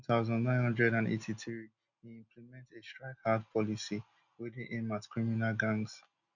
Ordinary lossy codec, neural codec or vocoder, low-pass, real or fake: none; none; 7.2 kHz; real